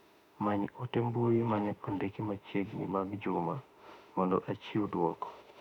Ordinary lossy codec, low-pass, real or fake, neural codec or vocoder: none; 19.8 kHz; fake; autoencoder, 48 kHz, 32 numbers a frame, DAC-VAE, trained on Japanese speech